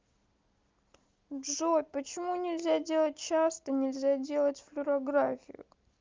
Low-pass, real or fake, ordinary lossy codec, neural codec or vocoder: 7.2 kHz; real; Opus, 32 kbps; none